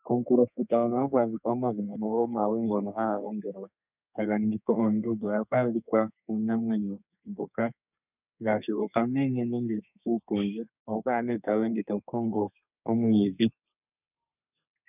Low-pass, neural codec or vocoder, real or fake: 3.6 kHz; codec, 32 kHz, 1.9 kbps, SNAC; fake